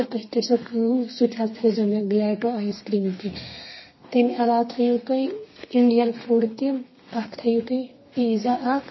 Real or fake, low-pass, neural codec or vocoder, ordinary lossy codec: fake; 7.2 kHz; codec, 32 kHz, 1.9 kbps, SNAC; MP3, 24 kbps